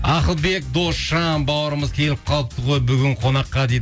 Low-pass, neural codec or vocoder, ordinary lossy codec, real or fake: none; none; none; real